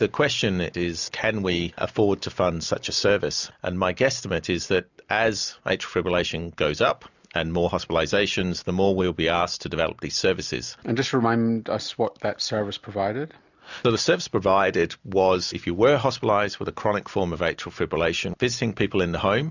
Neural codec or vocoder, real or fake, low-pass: none; real; 7.2 kHz